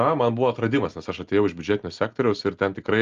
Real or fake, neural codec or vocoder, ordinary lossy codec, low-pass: real; none; Opus, 32 kbps; 7.2 kHz